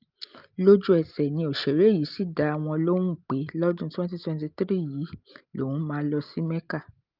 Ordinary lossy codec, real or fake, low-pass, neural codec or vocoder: Opus, 24 kbps; real; 5.4 kHz; none